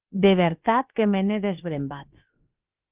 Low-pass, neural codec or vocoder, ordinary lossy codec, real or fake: 3.6 kHz; codec, 16 kHz, about 1 kbps, DyCAST, with the encoder's durations; Opus, 24 kbps; fake